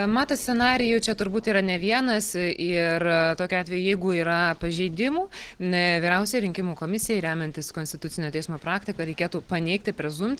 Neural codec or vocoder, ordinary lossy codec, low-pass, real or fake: none; Opus, 16 kbps; 19.8 kHz; real